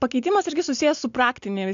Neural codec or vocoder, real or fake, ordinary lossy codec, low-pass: none; real; AAC, 64 kbps; 7.2 kHz